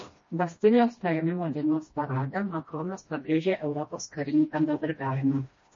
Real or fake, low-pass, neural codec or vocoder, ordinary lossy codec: fake; 7.2 kHz; codec, 16 kHz, 1 kbps, FreqCodec, smaller model; MP3, 32 kbps